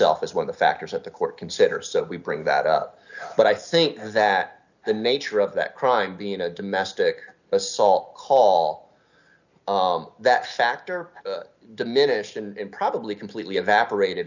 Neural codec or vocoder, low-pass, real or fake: none; 7.2 kHz; real